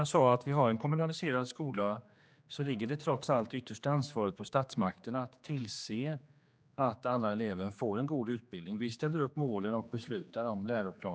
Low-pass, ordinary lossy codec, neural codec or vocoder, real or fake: none; none; codec, 16 kHz, 2 kbps, X-Codec, HuBERT features, trained on general audio; fake